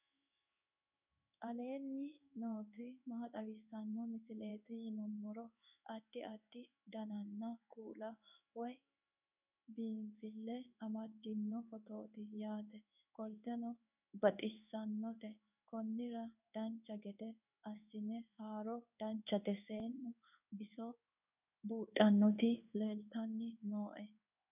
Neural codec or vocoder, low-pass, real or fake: codec, 16 kHz in and 24 kHz out, 1 kbps, XY-Tokenizer; 3.6 kHz; fake